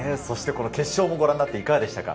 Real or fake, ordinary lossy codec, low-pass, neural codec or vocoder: real; none; none; none